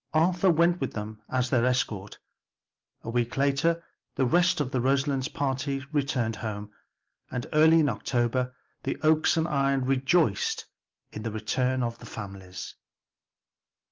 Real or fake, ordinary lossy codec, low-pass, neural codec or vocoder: real; Opus, 32 kbps; 7.2 kHz; none